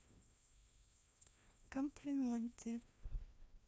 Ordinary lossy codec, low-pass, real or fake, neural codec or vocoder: none; none; fake; codec, 16 kHz, 1 kbps, FreqCodec, larger model